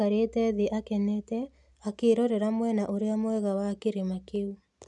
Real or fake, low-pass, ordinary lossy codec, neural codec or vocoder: real; 10.8 kHz; none; none